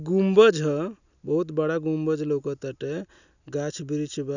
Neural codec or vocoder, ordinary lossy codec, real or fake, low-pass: none; none; real; 7.2 kHz